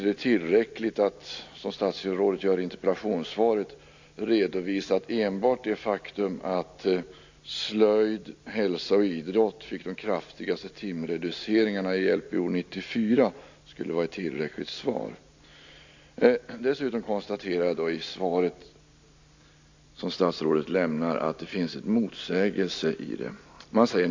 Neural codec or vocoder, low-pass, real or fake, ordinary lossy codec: none; 7.2 kHz; real; AAC, 48 kbps